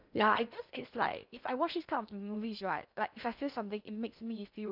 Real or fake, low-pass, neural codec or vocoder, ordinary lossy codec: fake; 5.4 kHz; codec, 16 kHz in and 24 kHz out, 0.6 kbps, FocalCodec, streaming, 2048 codes; none